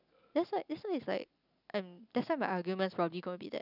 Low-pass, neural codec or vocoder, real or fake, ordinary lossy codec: 5.4 kHz; none; real; none